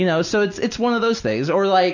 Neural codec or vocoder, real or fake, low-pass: none; real; 7.2 kHz